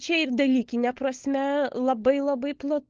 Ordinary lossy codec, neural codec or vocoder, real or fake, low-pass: Opus, 16 kbps; codec, 16 kHz, 16 kbps, FunCodec, trained on LibriTTS, 50 frames a second; fake; 7.2 kHz